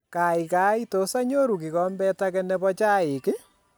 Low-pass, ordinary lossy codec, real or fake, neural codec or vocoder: none; none; real; none